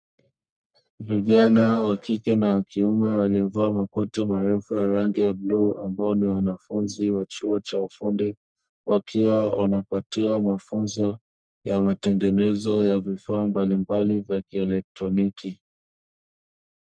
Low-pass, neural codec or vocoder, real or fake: 9.9 kHz; codec, 44.1 kHz, 1.7 kbps, Pupu-Codec; fake